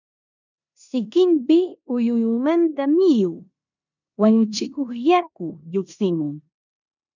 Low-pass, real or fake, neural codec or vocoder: 7.2 kHz; fake; codec, 16 kHz in and 24 kHz out, 0.9 kbps, LongCat-Audio-Codec, four codebook decoder